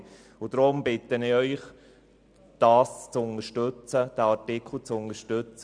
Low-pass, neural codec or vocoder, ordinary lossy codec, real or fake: 9.9 kHz; none; MP3, 96 kbps; real